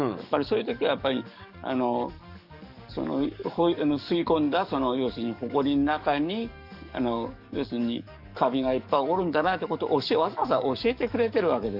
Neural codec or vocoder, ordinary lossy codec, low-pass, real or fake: codec, 44.1 kHz, 7.8 kbps, DAC; none; 5.4 kHz; fake